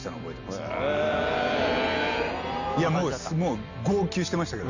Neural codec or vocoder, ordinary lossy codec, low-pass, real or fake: none; none; 7.2 kHz; real